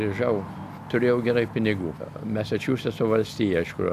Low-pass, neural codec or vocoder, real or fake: 14.4 kHz; none; real